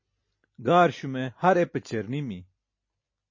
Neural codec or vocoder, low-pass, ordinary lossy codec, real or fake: none; 7.2 kHz; MP3, 32 kbps; real